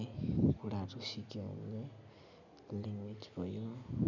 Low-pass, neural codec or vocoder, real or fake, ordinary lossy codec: 7.2 kHz; none; real; none